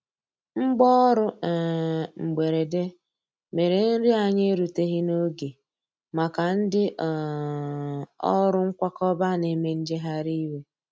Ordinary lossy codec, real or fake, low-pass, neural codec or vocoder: none; real; none; none